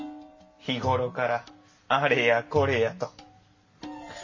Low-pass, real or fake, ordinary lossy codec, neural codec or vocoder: 7.2 kHz; real; MP3, 32 kbps; none